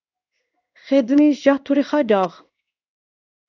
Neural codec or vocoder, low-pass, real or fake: codec, 16 kHz in and 24 kHz out, 1 kbps, XY-Tokenizer; 7.2 kHz; fake